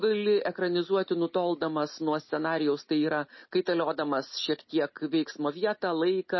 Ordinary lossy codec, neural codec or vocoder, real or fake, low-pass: MP3, 24 kbps; none; real; 7.2 kHz